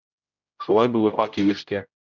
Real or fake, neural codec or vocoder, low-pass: fake; codec, 16 kHz, 0.5 kbps, X-Codec, HuBERT features, trained on general audio; 7.2 kHz